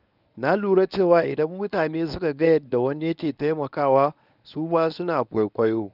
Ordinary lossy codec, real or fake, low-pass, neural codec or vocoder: none; fake; 5.4 kHz; codec, 24 kHz, 0.9 kbps, WavTokenizer, medium speech release version 1